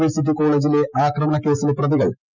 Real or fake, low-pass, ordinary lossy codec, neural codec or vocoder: real; 7.2 kHz; none; none